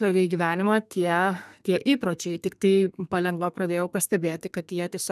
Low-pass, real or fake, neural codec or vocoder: 14.4 kHz; fake; codec, 32 kHz, 1.9 kbps, SNAC